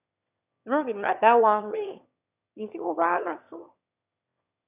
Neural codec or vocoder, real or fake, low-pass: autoencoder, 22.05 kHz, a latent of 192 numbers a frame, VITS, trained on one speaker; fake; 3.6 kHz